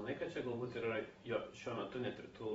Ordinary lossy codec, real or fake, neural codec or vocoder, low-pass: AAC, 24 kbps; fake; vocoder, 44.1 kHz, 128 mel bands every 512 samples, BigVGAN v2; 19.8 kHz